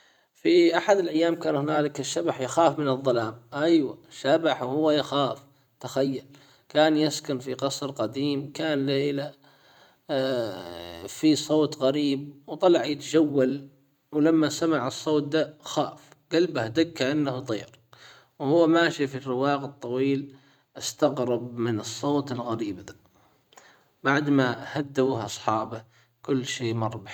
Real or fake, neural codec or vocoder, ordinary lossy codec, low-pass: fake; vocoder, 44.1 kHz, 128 mel bands every 512 samples, BigVGAN v2; none; 19.8 kHz